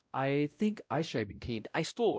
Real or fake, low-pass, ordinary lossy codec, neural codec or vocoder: fake; none; none; codec, 16 kHz, 0.5 kbps, X-Codec, WavLM features, trained on Multilingual LibriSpeech